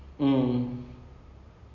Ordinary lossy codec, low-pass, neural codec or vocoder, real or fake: none; 7.2 kHz; none; real